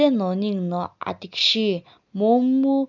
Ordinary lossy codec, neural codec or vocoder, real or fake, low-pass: none; none; real; 7.2 kHz